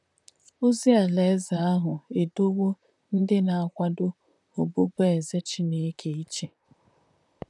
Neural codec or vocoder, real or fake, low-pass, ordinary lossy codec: vocoder, 44.1 kHz, 128 mel bands, Pupu-Vocoder; fake; 10.8 kHz; none